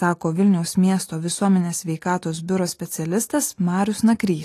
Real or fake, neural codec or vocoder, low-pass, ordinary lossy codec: real; none; 14.4 kHz; AAC, 48 kbps